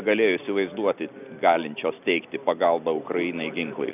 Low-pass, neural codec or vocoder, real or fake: 3.6 kHz; none; real